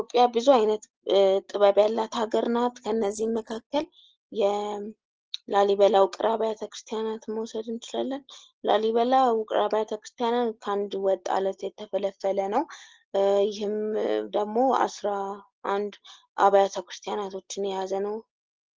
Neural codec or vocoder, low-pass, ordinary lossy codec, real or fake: none; 7.2 kHz; Opus, 16 kbps; real